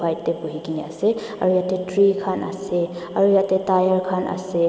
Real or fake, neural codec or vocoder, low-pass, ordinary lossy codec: real; none; none; none